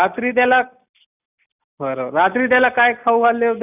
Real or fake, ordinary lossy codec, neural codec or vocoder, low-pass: real; none; none; 3.6 kHz